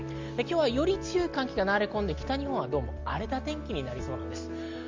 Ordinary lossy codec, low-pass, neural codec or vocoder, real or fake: Opus, 32 kbps; 7.2 kHz; none; real